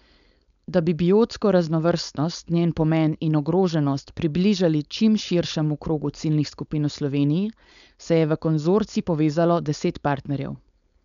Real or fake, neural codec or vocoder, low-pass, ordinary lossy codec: fake; codec, 16 kHz, 4.8 kbps, FACodec; 7.2 kHz; none